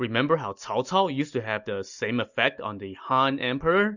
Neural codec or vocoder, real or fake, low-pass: none; real; 7.2 kHz